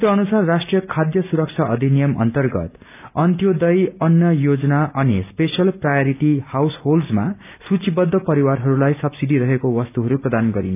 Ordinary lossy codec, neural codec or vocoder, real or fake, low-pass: none; none; real; 3.6 kHz